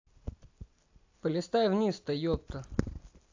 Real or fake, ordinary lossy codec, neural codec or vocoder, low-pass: real; AAC, 48 kbps; none; 7.2 kHz